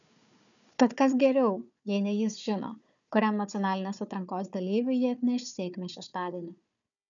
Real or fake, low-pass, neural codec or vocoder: fake; 7.2 kHz; codec, 16 kHz, 4 kbps, FunCodec, trained on Chinese and English, 50 frames a second